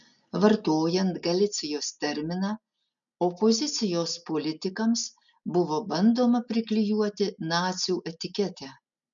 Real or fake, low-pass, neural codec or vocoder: real; 7.2 kHz; none